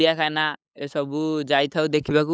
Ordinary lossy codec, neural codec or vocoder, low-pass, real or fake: none; codec, 16 kHz, 8 kbps, FunCodec, trained on LibriTTS, 25 frames a second; none; fake